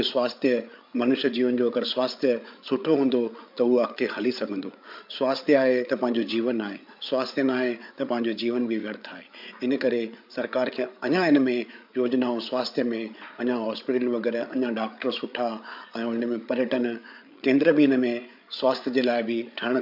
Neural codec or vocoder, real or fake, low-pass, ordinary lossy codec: codec, 16 kHz, 8 kbps, FreqCodec, larger model; fake; 5.4 kHz; MP3, 48 kbps